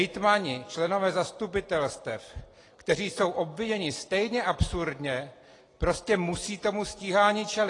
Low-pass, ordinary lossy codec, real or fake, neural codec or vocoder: 10.8 kHz; AAC, 32 kbps; real; none